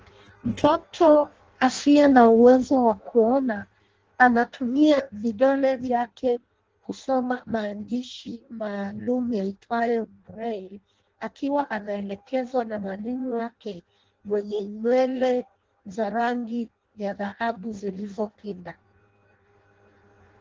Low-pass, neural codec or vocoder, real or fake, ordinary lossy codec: 7.2 kHz; codec, 16 kHz in and 24 kHz out, 0.6 kbps, FireRedTTS-2 codec; fake; Opus, 16 kbps